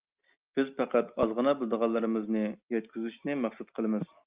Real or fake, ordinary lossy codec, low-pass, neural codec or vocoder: real; Opus, 24 kbps; 3.6 kHz; none